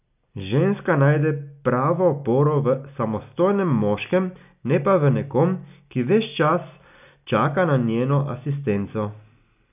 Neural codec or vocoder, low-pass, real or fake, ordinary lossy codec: none; 3.6 kHz; real; none